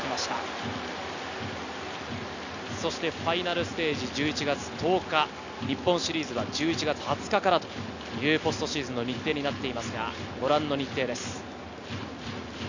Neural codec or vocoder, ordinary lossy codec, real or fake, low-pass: none; none; real; 7.2 kHz